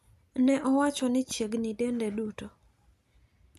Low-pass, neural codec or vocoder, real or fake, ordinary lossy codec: 14.4 kHz; none; real; none